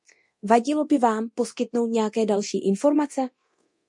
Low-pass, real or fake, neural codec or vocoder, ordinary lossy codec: 10.8 kHz; fake; codec, 24 kHz, 0.9 kbps, DualCodec; MP3, 48 kbps